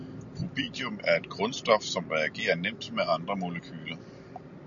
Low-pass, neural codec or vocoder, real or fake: 7.2 kHz; none; real